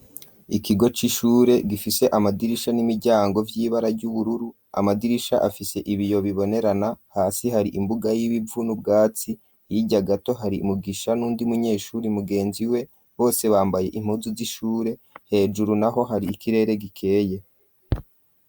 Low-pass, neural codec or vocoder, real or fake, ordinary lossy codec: 19.8 kHz; none; real; Opus, 64 kbps